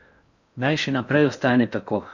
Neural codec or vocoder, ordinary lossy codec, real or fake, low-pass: codec, 16 kHz in and 24 kHz out, 0.6 kbps, FocalCodec, streaming, 4096 codes; none; fake; 7.2 kHz